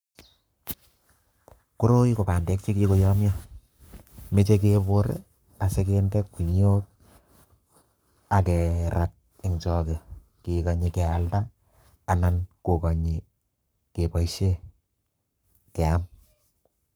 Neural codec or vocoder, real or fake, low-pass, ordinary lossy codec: codec, 44.1 kHz, 7.8 kbps, Pupu-Codec; fake; none; none